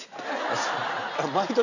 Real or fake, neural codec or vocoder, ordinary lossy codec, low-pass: real; none; none; 7.2 kHz